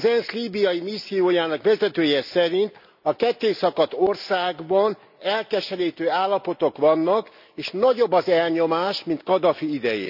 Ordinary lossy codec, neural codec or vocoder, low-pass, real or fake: none; none; 5.4 kHz; real